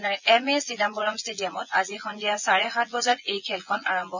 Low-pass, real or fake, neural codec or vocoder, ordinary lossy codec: 7.2 kHz; fake; vocoder, 24 kHz, 100 mel bands, Vocos; none